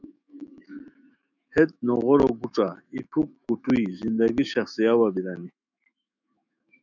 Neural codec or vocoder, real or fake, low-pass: autoencoder, 48 kHz, 128 numbers a frame, DAC-VAE, trained on Japanese speech; fake; 7.2 kHz